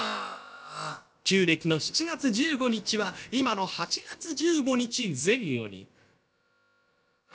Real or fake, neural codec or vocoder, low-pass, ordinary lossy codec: fake; codec, 16 kHz, about 1 kbps, DyCAST, with the encoder's durations; none; none